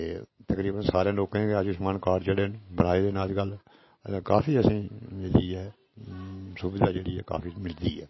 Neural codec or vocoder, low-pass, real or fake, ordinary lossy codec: none; 7.2 kHz; real; MP3, 24 kbps